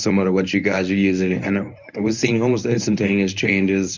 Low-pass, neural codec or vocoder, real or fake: 7.2 kHz; codec, 24 kHz, 0.9 kbps, WavTokenizer, medium speech release version 1; fake